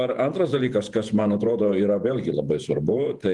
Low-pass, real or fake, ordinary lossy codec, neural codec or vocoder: 10.8 kHz; fake; Opus, 32 kbps; vocoder, 48 kHz, 128 mel bands, Vocos